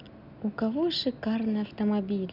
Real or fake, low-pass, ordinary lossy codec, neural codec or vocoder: real; 5.4 kHz; none; none